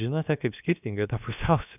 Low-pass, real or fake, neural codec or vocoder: 3.6 kHz; fake; codec, 16 kHz, about 1 kbps, DyCAST, with the encoder's durations